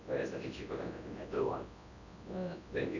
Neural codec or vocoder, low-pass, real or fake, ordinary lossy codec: codec, 24 kHz, 0.9 kbps, WavTokenizer, large speech release; 7.2 kHz; fake; none